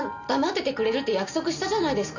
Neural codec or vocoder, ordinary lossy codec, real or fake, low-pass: none; none; real; 7.2 kHz